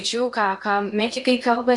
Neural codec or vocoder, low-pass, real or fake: codec, 16 kHz in and 24 kHz out, 0.8 kbps, FocalCodec, streaming, 65536 codes; 10.8 kHz; fake